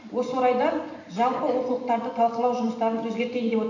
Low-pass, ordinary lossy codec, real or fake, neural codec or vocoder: 7.2 kHz; none; real; none